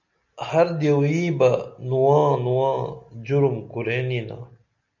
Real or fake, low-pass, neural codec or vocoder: real; 7.2 kHz; none